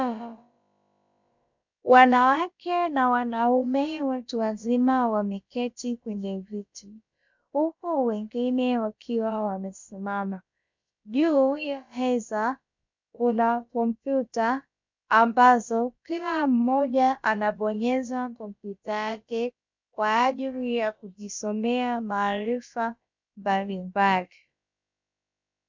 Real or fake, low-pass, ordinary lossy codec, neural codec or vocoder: fake; 7.2 kHz; MP3, 64 kbps; codec, 16 kHz, about 1 kbps, DyCAST, with the encoder's durations